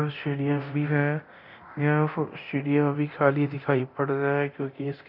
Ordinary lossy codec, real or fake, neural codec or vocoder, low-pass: none; fake; codec, 24 kHz, 0.9 kbps, DualCodec; 5.4 kHz